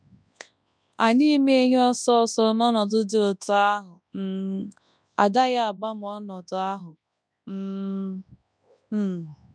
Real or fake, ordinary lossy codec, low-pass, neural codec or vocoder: fake; none; 9.9 kHz; codec, 24 kHz, 0.9 kbps, WavTokenizer, large speech release